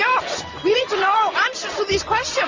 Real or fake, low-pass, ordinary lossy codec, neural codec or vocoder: real; 7.2 kHz; Opus, 32 kbps; none